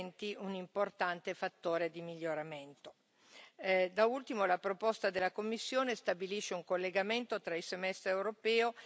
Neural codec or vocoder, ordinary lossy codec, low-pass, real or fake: none; none; none; real